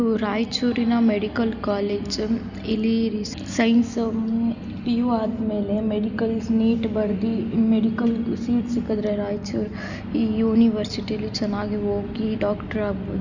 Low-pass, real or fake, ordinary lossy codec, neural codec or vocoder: 7.2 kHz; real; none; none